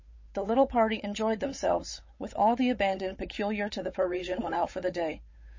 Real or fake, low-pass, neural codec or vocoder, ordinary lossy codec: fake; 7.2 kHz; codec, 16 kHz, 8 kbps, FunCodec, trained on Chinese and English, 25 frames a second; MP3, 32 kbps